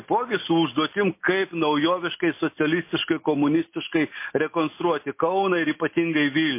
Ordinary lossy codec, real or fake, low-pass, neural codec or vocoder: MP3, 24 kbps; real; 3.6 kHz; none